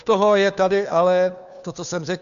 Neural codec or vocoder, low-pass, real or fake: codec, 16 kHz, 2 kbps, FunCodec, trained on Chinese and English, 25 frames a second; 7.2 kHz; fake